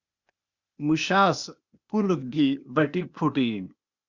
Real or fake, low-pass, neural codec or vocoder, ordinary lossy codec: fake; 7.2 kHz; codec, 16 kHz, 0.8 kbps, ZipCodec; Opus, 64 kbps